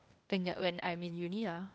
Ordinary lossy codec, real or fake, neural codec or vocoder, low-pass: none; fake; codec, 16 kHz, 0.8 kbps, ZipCodec; none